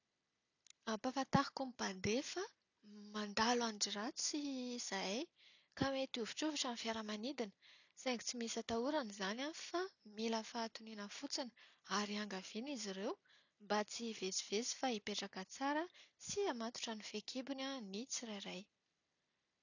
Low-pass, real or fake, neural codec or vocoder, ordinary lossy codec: 7.2 kHz; real; none; none